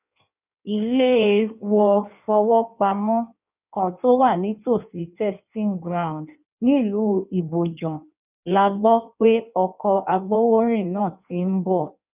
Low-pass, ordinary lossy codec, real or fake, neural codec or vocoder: 3.6 kHz; none; fake; codec, 16 kHz in and 24 kHz out, 1.1 kbps, FireRedTTS-2 codec